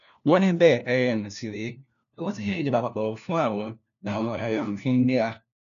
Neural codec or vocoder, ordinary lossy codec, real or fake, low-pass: codec, 16 kHz, 1 kbps, FunCodec, trained on LibriTTS, 50 frames a second; none; fake; 7.2 kHz